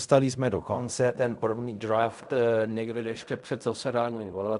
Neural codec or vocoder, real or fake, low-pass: codec, 16 kHz in and 24 kHz out, 0.4 kbps, LongCat-Audio-Codec, fine tuned four codebook decoder; fake; 10.8 kHz